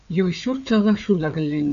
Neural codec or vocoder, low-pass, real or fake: codec, 16 kHz, 4 kbps, FreqCodec, larger model; 7.2 kHz; fake